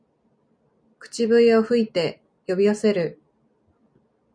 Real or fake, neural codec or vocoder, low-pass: real; none; 9.9 kHz